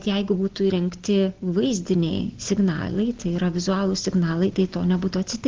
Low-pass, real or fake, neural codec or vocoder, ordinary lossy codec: 7.2 kHz; real; none; Opus, 16 kbps